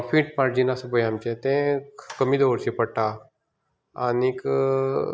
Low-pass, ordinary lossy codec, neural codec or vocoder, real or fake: none; none; none; real